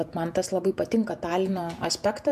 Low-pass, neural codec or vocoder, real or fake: 14.4 kHz; vocoder, 48 kHz, 128 mel bands, Vocos; fake